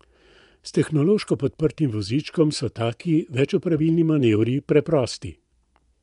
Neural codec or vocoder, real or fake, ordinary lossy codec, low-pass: vocoder, 24 kHz, 100 mel bands, Vocos; fake; none; 10.8 kHz